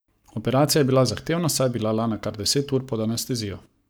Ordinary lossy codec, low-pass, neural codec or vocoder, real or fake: none; none; codec, 44.1 kHz, 7.8 kbps, Pupu-Codec; fake